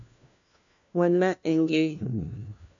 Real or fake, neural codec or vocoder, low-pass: fake; codec, 16 kHz, 1 kbps, FunCodec, trained on LibriTTS, 50 frames a second; 7.2 kHz